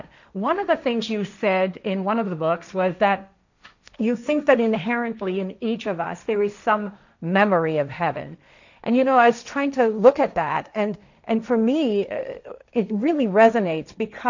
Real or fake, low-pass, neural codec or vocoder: fake; 7.2 kHz; codec, 16 kHz, 1.1 kbps, Voila-Tokenizer